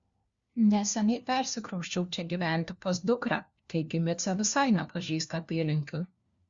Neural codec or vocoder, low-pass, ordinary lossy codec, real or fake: codec, 16 kHz, 1 kbps, FunCodec, trained on LibriTTS, 50 frames a second; 7.2 kHz; Opus, 64 kbps; fake